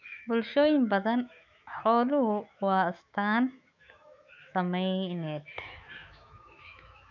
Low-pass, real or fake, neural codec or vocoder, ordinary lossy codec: 7.2 kHz; fake; autoencoder, 48 kHz, 128 numbers a frame, DAC-VAE, trained on Japanese speech; none